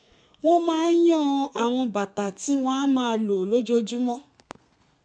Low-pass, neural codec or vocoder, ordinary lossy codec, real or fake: 9.9 kHz; codec, 32 kHz, 1.9 kbps, SNAC; none; fake